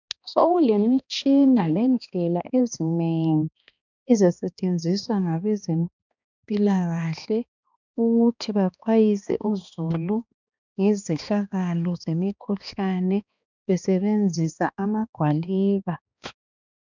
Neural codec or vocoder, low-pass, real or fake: codec, 16 kHz, 2 kbps, X-Codec, HuBERT features, trained on balanced general audio; 7.2 kHz; fake